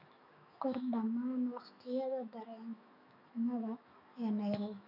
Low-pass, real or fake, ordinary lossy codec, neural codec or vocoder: 5.4 kHz; fake; none; autoencoder, 48 kHz, 128 numbers a frame, DAC-VAE, trained on Japanese speech